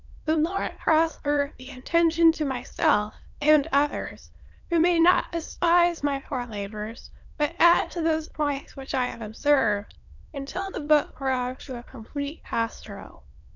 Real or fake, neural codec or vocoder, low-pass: fake; autoencoder, 22.05 kHz, a latent of 192 numbers a frame, VITS, trained on many speakers; 7.2 kHz